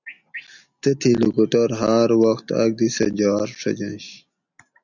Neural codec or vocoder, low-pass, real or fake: none; 7.2 kHz; real